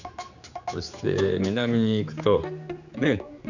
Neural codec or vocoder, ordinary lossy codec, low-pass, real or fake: codec, 16 kHz, 4 kbps, X-Codec, HuBERT features, trained on general audio; none; 7.2 kHz; fake